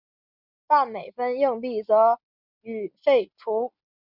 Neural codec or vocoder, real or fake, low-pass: none; real; 5.4 kHz